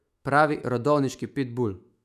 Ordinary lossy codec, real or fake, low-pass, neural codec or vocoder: none; fake; 14.4 kHz; autoencoder, 48 kHz, 128 numbers a frame, DAC-VAE, trained on Japanese speech